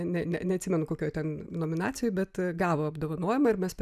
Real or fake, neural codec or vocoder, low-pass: real; none; 14.4 kHz